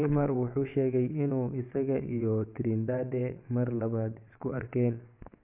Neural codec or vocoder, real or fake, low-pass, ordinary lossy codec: vocoder, 22.05 kHz, 80 mel bands, WaveNeXt; fake; 3.6 kHz; MP3, 32 kbps